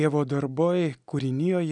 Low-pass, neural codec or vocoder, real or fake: 9.9 kHz; none; real